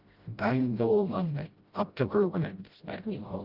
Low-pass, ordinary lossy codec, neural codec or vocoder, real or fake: 5.4 kHz; Opus, 32 kbps; codec, 16 kHz, 0.5 kbps, FreqCodec, smaller model; fake